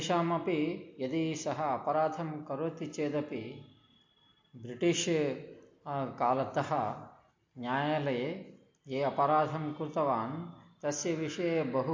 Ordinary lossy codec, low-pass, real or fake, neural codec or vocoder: MP3, 48 kbps; 7.2 kHz; real; none